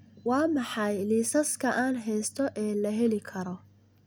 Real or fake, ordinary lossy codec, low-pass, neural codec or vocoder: real; none; none; none